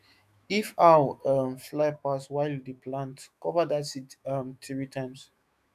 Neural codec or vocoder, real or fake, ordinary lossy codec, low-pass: autoencoder, 48 kHz, 128 numbers a frame, DAC-VAE, trained on Japanese speech; fake; none; 14.4 kHz